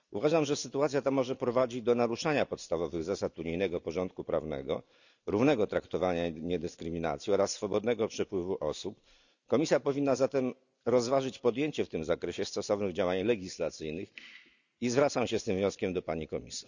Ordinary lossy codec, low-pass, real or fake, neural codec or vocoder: none; 7.2 kHz; real; none